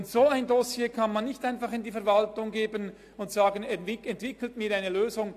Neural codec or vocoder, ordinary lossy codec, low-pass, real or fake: none; AAC, 64 kbps; 14.4 kHz; real